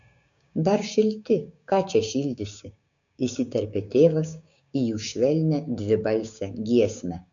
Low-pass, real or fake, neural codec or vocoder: 7.2 kHz; fake; codec, 16 kHz, 6 kbps, DAC